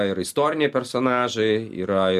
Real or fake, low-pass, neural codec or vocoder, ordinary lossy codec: real; 14.4 kHz; none; MP3, 96 kbps